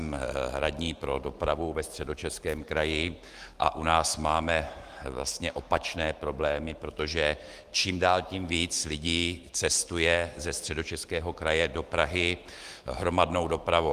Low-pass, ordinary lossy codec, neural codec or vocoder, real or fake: 14.4 kHz; Opus, 24 kbps; autoencoder, 48 kHz, 128 numbers a frame, DAC-VAE, trained on Japanese speech; fake